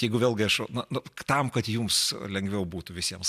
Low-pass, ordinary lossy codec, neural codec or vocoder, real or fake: 14.4 kHz; MP3, 96 kbps; none; real